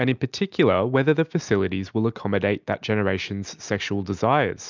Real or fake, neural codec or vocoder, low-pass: real; none; 7.2 kHz